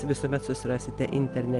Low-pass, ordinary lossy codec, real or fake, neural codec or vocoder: 10.8 kHz; Opus, 32 kbps; real; none